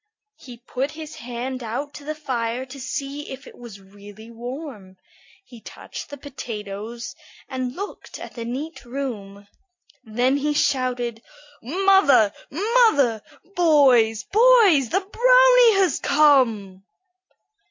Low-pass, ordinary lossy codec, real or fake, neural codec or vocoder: 7.2 kHz; MP3, 64 kbps; real; none